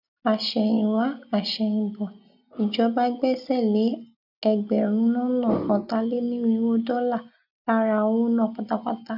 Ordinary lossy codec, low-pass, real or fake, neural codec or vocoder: none; 5.4 kHz; real; none